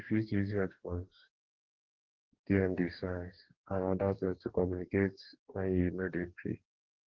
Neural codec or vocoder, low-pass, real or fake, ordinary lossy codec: codec, 44.1 kHz, 2.6 kbps, DAC; 7.2 kHz; fake; Opus, 16 kbps